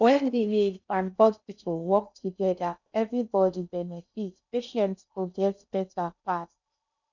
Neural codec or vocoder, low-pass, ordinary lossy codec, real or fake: codec, 16 kHz in and 24 kHz out, 0.6 kbps, FocalCodec, streaming, 4096 codes; 7.2 kHz; none; fake